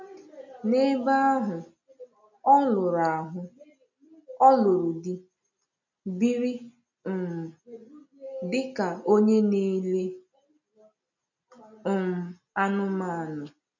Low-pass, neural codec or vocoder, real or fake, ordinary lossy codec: 7.2 kHz; none; real; none